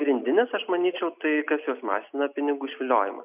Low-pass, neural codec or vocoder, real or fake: 3.6 kHz; none; real